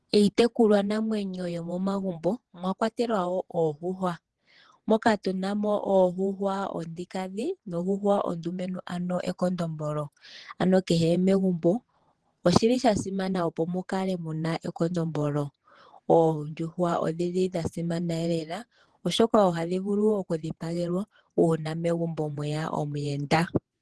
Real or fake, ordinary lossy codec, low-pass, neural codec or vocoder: fake; Opus, 16 kbps; 10.8 kHz; vocoder, 48 kHz, 128 mel bands, Vocos